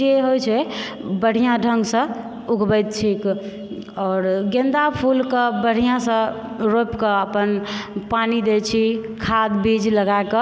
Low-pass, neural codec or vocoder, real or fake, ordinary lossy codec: none; none; real; none